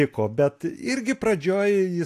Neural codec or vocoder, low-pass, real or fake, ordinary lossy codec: none; 14.4 kHz; real; AAC, 64 kbps